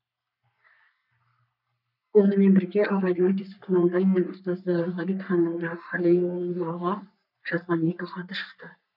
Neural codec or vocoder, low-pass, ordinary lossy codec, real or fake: codec, 44.1 kHz, 3.4 kbps, Pupu-Codec; 5.4 kHz; none; fake